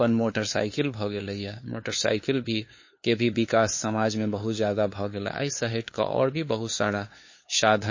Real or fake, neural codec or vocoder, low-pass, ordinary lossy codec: fake; codec, 16 kHz, 2 kbps, FunCodec, trained on LibriTTS, 25 frames a second; 7.2 kHz; MP3, 32 kbps